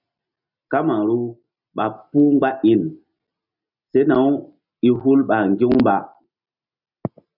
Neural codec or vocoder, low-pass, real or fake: none; 5.4 kHz; real